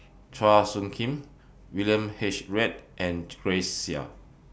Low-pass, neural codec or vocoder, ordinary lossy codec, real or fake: none; none; none; real